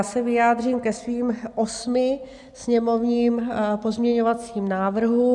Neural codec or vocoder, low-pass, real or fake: none; 10.8 kHz; real